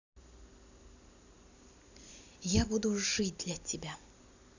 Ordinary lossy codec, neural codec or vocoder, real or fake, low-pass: none; none; real; 7.2 kHz